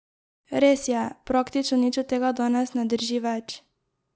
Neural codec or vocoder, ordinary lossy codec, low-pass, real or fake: none; none; none; real